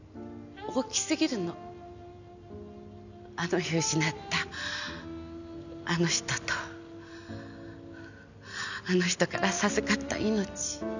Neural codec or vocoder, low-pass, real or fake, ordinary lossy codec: none; 7.2 kHz; real; none